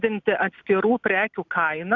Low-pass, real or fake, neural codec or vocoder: 7.2 kHz; real; none